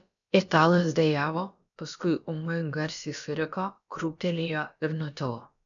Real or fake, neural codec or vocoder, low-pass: fake; codec, 16 kHz, about 1 kbps, DyCAST, with the encoder's durations; 7.2 kHz